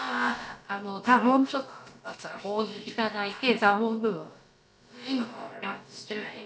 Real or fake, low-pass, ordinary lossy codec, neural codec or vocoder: fake; none; none; codec, 16 kHz, about 1 kbps, DyCAST, with the encoder's durations